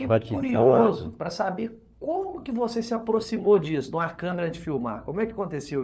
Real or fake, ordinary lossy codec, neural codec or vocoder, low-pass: fake; none; codec, 16 kHz, 4 kbps, FunCodec, trained on LibriTTS, 50 frames a second; none